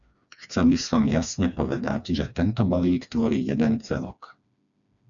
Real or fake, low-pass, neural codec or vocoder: fake; 7.2 kHz; codec, 16 kHz, 2 kbps, FreqCodec, smaller model